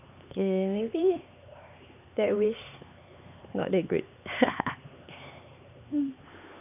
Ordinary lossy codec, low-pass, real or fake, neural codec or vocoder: none; 3.6 kHz; fake; codec, 16 kHz, 4 kbps, X-Codec, HuBERT features, trained on LibriSpeech